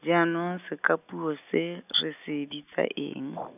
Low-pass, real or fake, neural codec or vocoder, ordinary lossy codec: 3.6 kHz; real; none; none